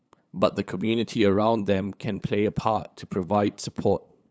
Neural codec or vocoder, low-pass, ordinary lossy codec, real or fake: codec, 16 kHz, 8 kbps, FunCodec, trained on LibriTTS, 25 frames a second; none; none; fake